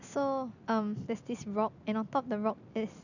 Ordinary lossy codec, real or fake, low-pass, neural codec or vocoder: none; real; 7.2 kHz; none